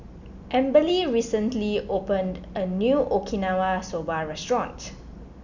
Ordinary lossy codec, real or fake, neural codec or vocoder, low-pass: none; real; none; 7.2 kHz